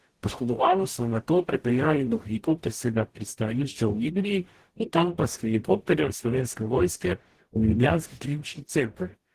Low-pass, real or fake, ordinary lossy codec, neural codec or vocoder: 14.4 kHz; fake; Opus, 16 kbps; codec, 44.1 kHz, 0.9 kbps, DAC